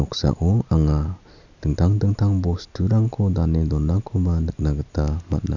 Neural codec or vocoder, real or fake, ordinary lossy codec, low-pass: none; real; none; 7.2 kHz